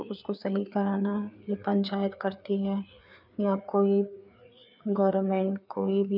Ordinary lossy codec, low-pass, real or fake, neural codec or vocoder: none; 5.4 kHz; fake; codec, 16 kHz, 4 kbps, FreqCodec, larger model